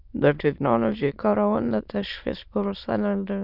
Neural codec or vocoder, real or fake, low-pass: autoencoder, 22.05 kHz, a latent of 192 numbers a frame, VITS, trained on many speakers; fake; 5.4 kHz